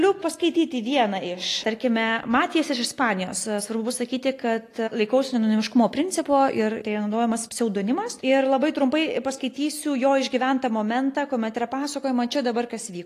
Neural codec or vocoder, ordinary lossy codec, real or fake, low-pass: none; AAC, 48 kbps; real; 14.4 kHz